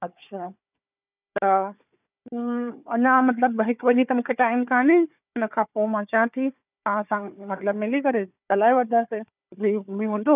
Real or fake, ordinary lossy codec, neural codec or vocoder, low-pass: fake; none; codec, 16 kHz, 4 kbps, FunCodec, trained on Chinese and English, 50 frames a second; 3.6 kHz